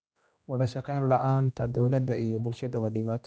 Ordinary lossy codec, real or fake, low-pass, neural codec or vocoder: none; fake; none; codec, 16 kHz, 1 kbps, X-Codec, HuBERT features, trained on general audio